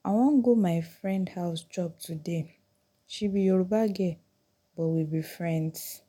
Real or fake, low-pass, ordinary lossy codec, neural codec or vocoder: real; 19.8 kHz; none; none